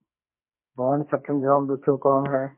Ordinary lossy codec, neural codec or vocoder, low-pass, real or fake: MP3, 24 kbps; codec, 44.1 kHz, 2.6 kbps, SNAC; 3.6 kHz; fake